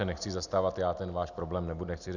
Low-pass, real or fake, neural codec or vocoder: 7.2 kHz; real; none